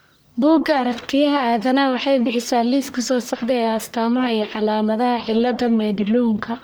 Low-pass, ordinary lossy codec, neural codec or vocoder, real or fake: none; none; codec, 44.1 kHz, 1.7 kbps, Pupu-Codec; fake